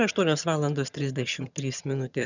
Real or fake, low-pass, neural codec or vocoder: fake; 7.2 kHz; vocoder, 22.05 kHz, 80 mel bands, HiFi-GAN